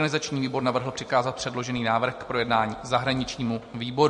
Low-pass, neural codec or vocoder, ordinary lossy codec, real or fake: 14.4 kHz; none; MP3, 48 kbps; real